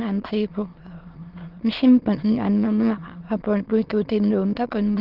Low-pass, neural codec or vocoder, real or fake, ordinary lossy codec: 5.4 kHz; autoencoder, 22.05 kHz, a latent of 192 numbers a frame, VITS, trained on many speakers; fake; Opus, 16 kbps